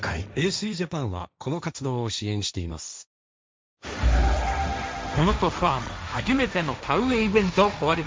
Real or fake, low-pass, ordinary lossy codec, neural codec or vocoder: fake; none; none; codec, 16 kHz, 1.1 kbps, Voila-Tokenizer